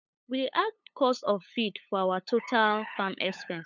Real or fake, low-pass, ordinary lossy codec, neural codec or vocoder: fake; 7.2 kHz; none; codec, 16 kHz, 8 kbps, FunCodec, trained on LibriTTS, 25 frames a second